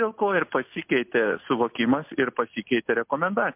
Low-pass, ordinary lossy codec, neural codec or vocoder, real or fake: 3.6 kHz; MP3, 32 kbps; none; real